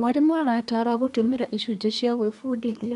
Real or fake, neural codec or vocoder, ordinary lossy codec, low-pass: fake; codec, 24 kHz, 1 kbps, SNAC; none; 10.8 kHz